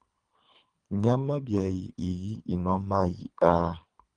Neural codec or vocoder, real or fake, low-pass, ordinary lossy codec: codec, 24 kHz, 3 kbps, HILCodec; fake; 9.9 kHz; Opus, 32 kbps